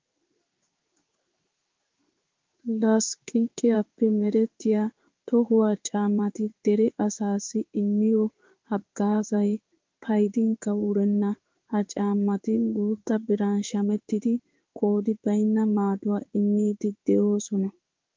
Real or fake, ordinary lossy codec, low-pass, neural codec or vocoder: fake; Opus, 32 kbps; 7.2 kHz; codec, 16 kHz in and 24 kHz out, 1 kbps, XY-Tokenizer